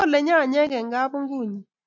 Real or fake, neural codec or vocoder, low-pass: real; none; 7.2 kHz